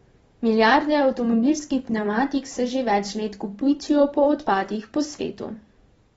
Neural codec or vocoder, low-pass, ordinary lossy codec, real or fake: vocoder, 44.1 kHz, 128 mel bands every 512 samples, BigVGAN v2; 19.8 kHz; AAC, 24 kbps; fake